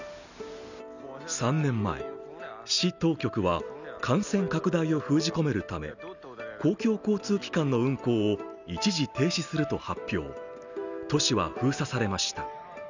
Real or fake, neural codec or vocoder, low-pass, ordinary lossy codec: real; none; 7.2 kHz; none